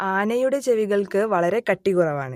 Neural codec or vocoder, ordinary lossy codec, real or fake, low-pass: none; MP3, 64 kbps; real; 19.8 kHz